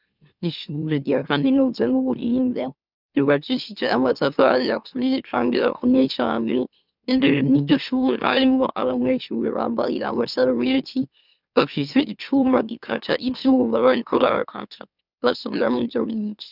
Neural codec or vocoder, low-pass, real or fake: autoencoder, 44.1 kHz, a latent of 192 numbers a frame, MeloTTS; 5.4 kHz; fake